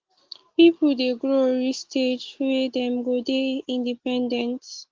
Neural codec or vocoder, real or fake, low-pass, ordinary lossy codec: none; real; 7.2 kHz; Opus, 16 kbps